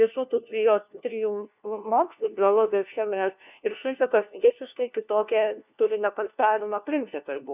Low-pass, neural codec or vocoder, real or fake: 3.6 kHz; codec, 16 kHz, 1 kbps, FunCodec, trained on LibriTTS, 50 frames a second; fake